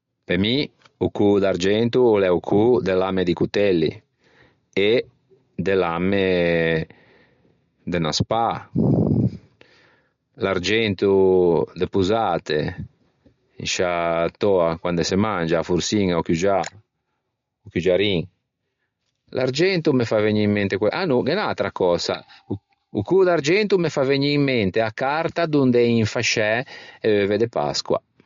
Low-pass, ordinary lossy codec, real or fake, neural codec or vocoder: 7.2 kHz; MP3, 48 kbps; real; none